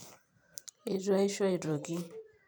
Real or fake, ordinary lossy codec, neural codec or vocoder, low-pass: fake; none; vocoder, 44.1 kHz, 128 mel bands every 512 samples, BigVGAN v2; none